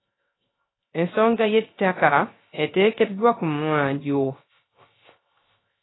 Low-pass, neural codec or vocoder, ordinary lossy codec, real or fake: 7.2 kHz; codec, 16 kHz, 0.3 kbps, FocalCodec; AAC, 16 kbps; fake